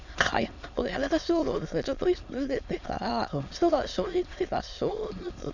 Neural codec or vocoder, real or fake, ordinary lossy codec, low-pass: autoencoder, 22.05 kHz, a latent of 192 numbers a frame, VITS, trained on many speakers; fake; none; 7.2 kHz